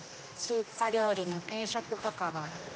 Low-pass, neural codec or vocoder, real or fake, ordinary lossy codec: none; codec, 16 kHz, 1 kbps, X-Codec, HuBERT features, trained on general audio; fake; none